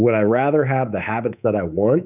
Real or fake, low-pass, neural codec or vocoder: fake; 3.6 kHz; codec, 24 kHz, 3.1 kbps, DualCodec